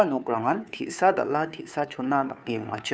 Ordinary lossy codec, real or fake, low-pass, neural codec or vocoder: none; fake; none; codec, 16 kHz, 2 kbps, FunCodec, trained on Chinese and English, 25 frames a second